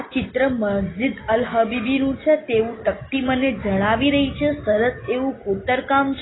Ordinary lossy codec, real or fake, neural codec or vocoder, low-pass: AAC, 16 kbps; real; none; 7.2 kHz